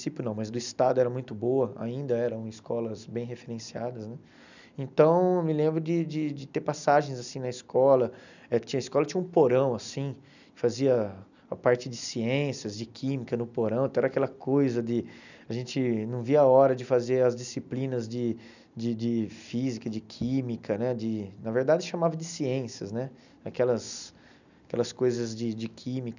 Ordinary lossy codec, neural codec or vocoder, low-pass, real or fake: none; none; 7.2 kHz; real